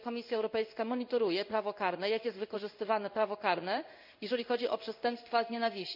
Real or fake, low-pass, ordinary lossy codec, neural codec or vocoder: fake; 5.4 kHz; none; codec, 16 kHz in and 24 kHz out, 1 kbps, XY-Tokenizer